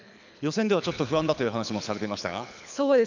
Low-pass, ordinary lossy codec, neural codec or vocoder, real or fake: 7.2 kHz; none; codec, 24 kHz, 6 kbps, HILCodec; fake